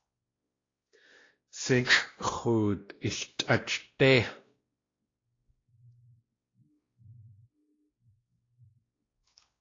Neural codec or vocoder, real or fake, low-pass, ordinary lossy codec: codec, 16 kHz, 1 kbps, X-Codec, WavLM features, trained on Multilingual LibriSpeech; fake; 7.2 kHz; AAC, 32 kbps